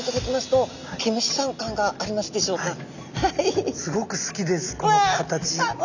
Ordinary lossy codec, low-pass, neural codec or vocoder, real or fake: none; 7.2 kHz; none; real